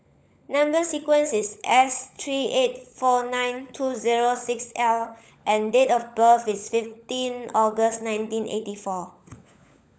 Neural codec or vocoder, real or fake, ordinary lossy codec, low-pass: codec, 16 kHz, 16 kbps, FunCodec, trained on LibriTTS, 50 frames a second; fake; none; none